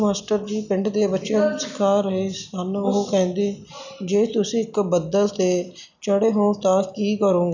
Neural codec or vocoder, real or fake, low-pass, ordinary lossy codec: none; real; 7.2 kHz; none